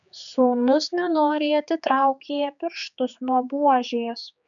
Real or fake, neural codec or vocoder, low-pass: fake; codec, 16 kHz, 4 kbps, X-Codec, HuBERT features, trained on general audio; 7.2 kHz